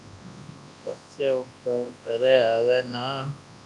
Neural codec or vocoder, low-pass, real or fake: codec, 24 kHz, 0.9 kbps, WavTokenizer, large speech release; 10.8 kHz; fake